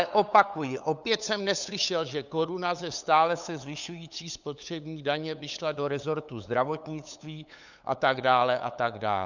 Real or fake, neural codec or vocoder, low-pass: fake; codec, 16 kHz, 8 kbps, FunCodec, trained on LibriTTS, 25 frames a second; 7.2 kHz